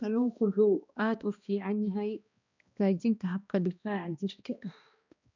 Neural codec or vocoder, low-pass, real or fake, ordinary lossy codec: codec, 16 kHz, 1 kbps, X-Codec, HuBERT features, trained on balanced general audio; 7.2 kHz; fake; none